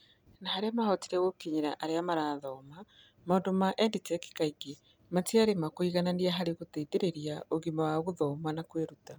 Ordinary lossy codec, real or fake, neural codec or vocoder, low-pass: none; real; none; none